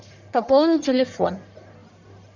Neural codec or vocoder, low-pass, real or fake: codec, 44.1 kHz, 1.7 kbps, Pupu-Codec; 7.2 kHz; fake